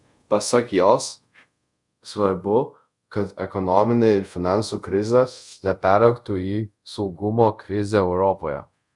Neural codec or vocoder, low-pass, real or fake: codec, 24 kHz, 0.5 kbps, DualCodec; 10.8 kHz; fake